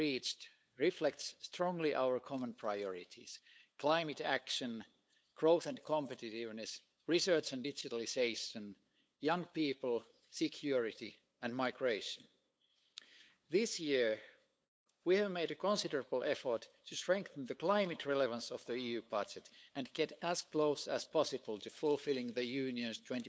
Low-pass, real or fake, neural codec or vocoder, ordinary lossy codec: none; fake; codec, 16 kHz, 8 kbps, FunCodec, trained on LibriTTS, 25 frames a second; none